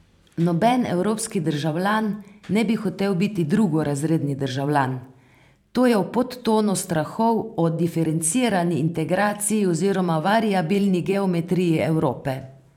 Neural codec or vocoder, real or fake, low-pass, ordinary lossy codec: vocoder, 44.1 kHz, 128 mel bands every 512 samples, BigVGAN v2; fake; 19.8 kHz; none